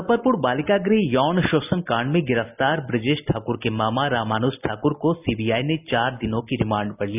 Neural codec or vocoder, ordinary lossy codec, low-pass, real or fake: none; none; 3.6 kHz; real